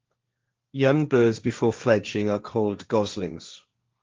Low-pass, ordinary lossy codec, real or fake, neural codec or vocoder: 7.2 kHz; Opus, 32 kbps; fake; codec, 16 kHz, 1.1 kbps, Voila-Tokenizer